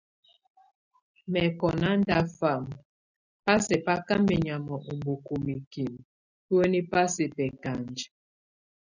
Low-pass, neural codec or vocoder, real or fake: 7.2 kHz; none; real